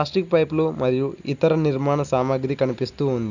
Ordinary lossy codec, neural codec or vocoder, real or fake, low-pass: none; none; real; 7.2 kHz